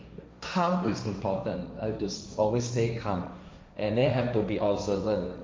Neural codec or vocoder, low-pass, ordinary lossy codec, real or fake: codec, 16 kHz, 1.1 kbps, Voila-Tokenizer; none; none; fake